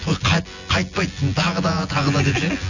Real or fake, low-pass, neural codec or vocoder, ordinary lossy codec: fake; 7.2 kHz; vocoder, 24 kHz, 100 mel bands, Vocos; none